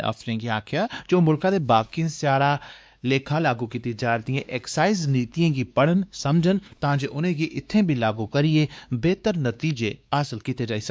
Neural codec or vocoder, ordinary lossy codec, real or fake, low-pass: codec, 16 kHz, 2 kbps, X-Codec, WavLM features, trained on Multilingual LibriSpeech; none; fake; none